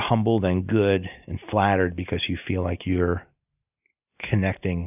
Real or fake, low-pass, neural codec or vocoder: real; 3.6 kHz; none